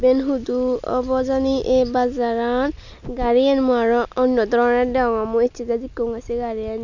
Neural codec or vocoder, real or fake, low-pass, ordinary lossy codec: none; real; 7.2 kHz; none